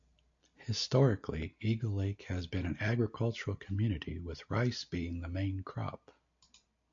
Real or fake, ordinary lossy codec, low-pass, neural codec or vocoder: real; AAC, 48 kbps; 7.2 kHz; none